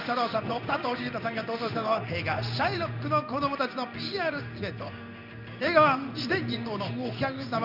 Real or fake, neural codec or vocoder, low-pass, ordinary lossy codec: fake; codec, 16 kHz in and 24 kHz out, 1 kbps, XY-Tokenizer; 5.4 kHz; none